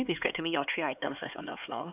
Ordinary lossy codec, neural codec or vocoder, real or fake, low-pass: none; codec, 16 kHz, 4 kbps, X-Codec, HuBERT features, trained on LibriSpeech; fake; 3.6 kHz